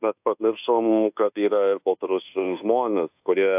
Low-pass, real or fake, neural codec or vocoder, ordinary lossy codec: 3.6 kHz; fake; codec, 24 kHz, 1.2 kbps, DualCodec; AAC, 32 kbps